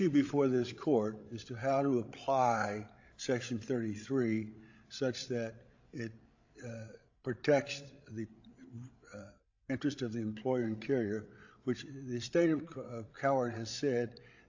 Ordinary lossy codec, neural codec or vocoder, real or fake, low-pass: AAC, 48 kbps; codec, 16 kHz, 16 kbps, FreqCodec, larger model; fake; 7.2 kHz